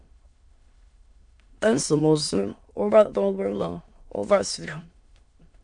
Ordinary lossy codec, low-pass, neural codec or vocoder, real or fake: MP3, 64 kbps; 9.9 kHz; autoencoder, 22.05 kHz, a latent of 192 numbers a frame, VITS, trained on many speakers; fake